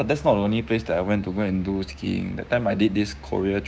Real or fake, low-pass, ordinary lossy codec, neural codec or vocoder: fake; none; none; codec, 16 kHz, 6 kbps, DAC